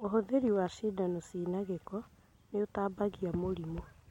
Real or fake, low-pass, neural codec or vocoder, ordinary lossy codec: real; 19.8 kHz; none; MP3, 64 kbps